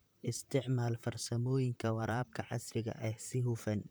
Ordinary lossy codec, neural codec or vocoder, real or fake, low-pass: none; vocoder, 44.1 kHz, 128 mel bands, Pupu-Vocoder; fake; none